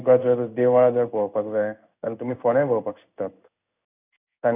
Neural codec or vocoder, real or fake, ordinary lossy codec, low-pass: codec, 16 kHz in and 24 kHz out, 1 kbps, XY-Tokenizer; fake; none; 3.6 kHz